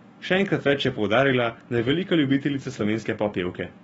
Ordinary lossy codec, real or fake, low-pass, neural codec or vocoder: AAC, 24 kbps; fake; 19.8 kHz; autoencoder, 48 kHz, 128 numbers a frame, DAC-VAE, trained on Japanese speech